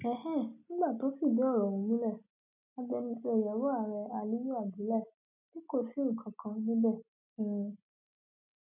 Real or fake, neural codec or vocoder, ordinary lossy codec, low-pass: real; none; none; 3.6 kHz